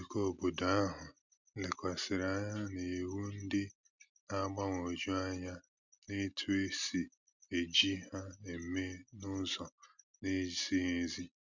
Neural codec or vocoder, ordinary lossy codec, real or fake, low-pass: none; none; real; 7.2 kHz